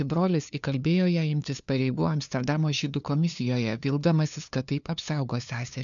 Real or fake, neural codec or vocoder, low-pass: fake; codec, 16 kHz, 2 kbps, FunCodec, trained on LibriTTS, 25 frames a second; 7.2 kHz